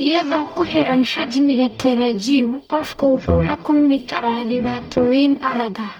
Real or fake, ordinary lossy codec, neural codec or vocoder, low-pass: fake; none; codec, 44.1 kHz, 0.9 kbps, DAC; 19.8 kHz